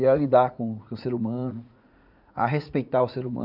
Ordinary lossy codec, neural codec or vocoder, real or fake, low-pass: none; vocoder, 44.1 kHz, 80 mel bands, Vocos; fake; 5.4 kHz